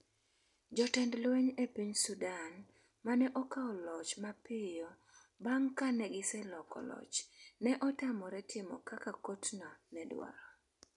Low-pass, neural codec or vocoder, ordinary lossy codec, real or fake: 10.8 kHz; none; none; real